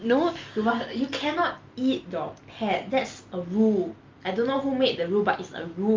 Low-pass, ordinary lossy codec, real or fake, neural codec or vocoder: 7.2 kHz; Opus, 32 kbps; real; none